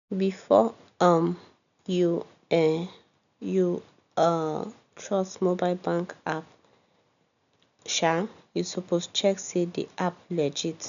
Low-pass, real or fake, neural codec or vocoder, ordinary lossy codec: 7.2 kHz; real; none; none